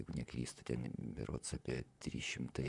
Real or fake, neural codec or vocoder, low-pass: fake; vocoder, 44.1 kHz, 128 mel bands, Pupu-Vocoder; 10.8 kHz